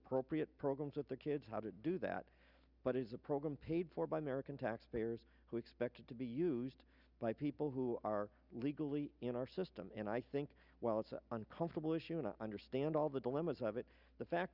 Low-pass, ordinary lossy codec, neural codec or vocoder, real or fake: 5.4 kHz; Opus, 64 kbps; none; real